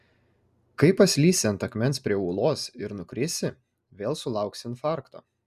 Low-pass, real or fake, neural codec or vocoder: 14.4 kHz; real; none